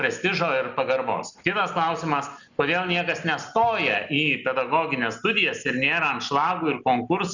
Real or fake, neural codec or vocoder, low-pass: real; none; 7.2 kHz